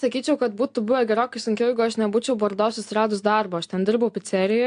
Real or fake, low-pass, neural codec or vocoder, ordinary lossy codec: real; 9.9 kHz; none; AAC, 64 kbps